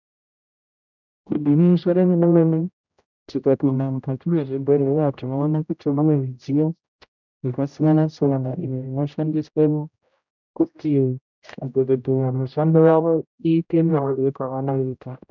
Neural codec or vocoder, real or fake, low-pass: codec, 16 kHz, 0.5 kbps, X-Codec, HuBERT features, trained on general audio; fake; 7.2 kHz